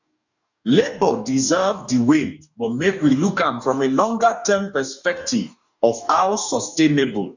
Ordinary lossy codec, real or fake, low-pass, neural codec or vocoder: none; fake; 7.2 kHz; codec, 44.1 kHz, 2.6 kbps, DAC